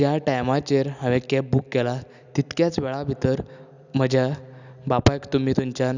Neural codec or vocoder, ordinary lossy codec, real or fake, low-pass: none; none; real; 7.2 kHz